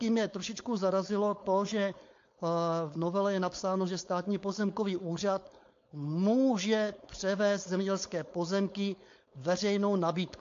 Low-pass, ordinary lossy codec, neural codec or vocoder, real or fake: 7.2 kHz; AAC, 48 kbps; codec, 16 kHz, 4.8 kbps, FACodec; fake